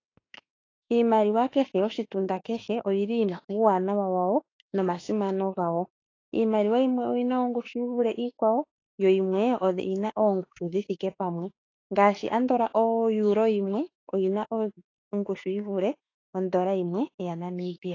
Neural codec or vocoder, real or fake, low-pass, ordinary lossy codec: autoencoder, 48 kHz, 32 numbers a frame, DAC-VAE, trained on Japanese speech; fake; 7.2 kHz; AAC, 32 kbps